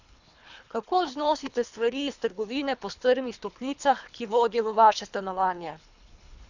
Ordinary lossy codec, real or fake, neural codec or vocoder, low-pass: none; fake; codec, 24 kHz, 3 kbps, HILCodec; 7.2 kHz